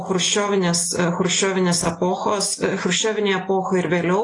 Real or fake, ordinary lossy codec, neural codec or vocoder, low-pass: real; AAC, 32 kbps; none; 10.8 kHz